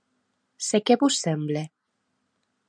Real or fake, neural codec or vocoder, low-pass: real; none; 9.9 kHz